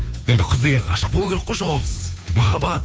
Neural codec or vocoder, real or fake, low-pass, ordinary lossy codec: codec, 16 kHz, 2 kbps, FunCodec, trained on Chinese and English, 25 frames a second; fake; none; none